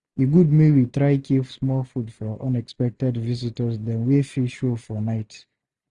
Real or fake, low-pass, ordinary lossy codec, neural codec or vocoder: real; 10.8 kHz; Opus, 64 kbps; none